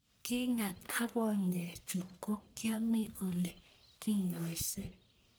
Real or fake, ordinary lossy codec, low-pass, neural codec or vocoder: fake; none; none; codec, 44.1 kHz, 1.7 kbps, Pupu-Codec